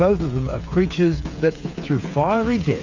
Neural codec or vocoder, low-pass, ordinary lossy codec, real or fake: codec, 16 kHz, 8 kbps, FreqCodec, smaller model; 7.2 kHz; MP3, 64 kbps; fake